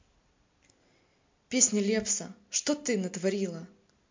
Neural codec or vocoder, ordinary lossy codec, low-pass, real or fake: none; MP3, 48 kbps; 7.2 kHz; real